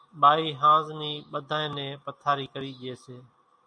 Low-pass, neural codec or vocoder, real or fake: 9.9 kHz; none; real